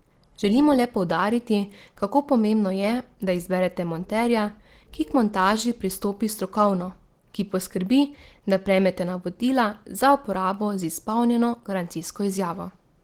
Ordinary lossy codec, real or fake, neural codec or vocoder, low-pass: Opus, 16 kbps; real; none; 19.8 kHz